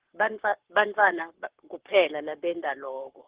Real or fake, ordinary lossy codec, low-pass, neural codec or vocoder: fake; Opus, 16 kbps; 3.6 kHz; codec, 44.1 kHz, 7.8 kbps, Pupu-Codec